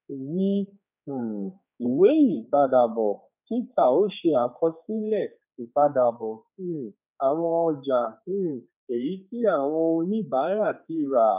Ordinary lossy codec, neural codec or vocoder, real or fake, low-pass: none; codec, 16 kHz, 4 kbps, X-Codec, HuBERT features, trained on general audio; fake; 3.6 kHz